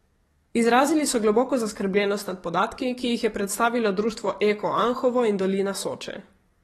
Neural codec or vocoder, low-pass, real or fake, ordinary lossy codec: codec, 44.1 kHz, 7.8 kbps, DAC; 19.8 kHz; fake; AAC, 32 kbps